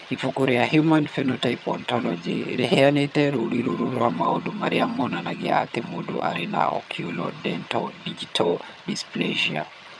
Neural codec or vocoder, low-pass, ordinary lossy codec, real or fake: vocoder, 22.05 kHz, 80 mel bands, HiFi-GAN; none; none; fake